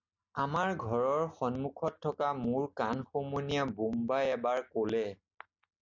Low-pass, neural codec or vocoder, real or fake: 7.2 kHz; none; real